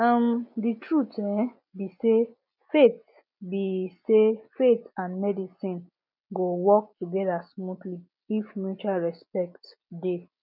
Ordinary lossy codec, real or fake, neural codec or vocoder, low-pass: none; real; none; 5.4 kHz